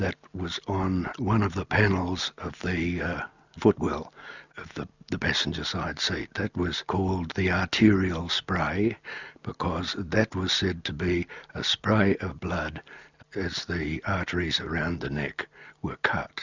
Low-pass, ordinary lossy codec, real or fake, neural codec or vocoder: 7.2 kHz; Opus, 64 kbps; real; none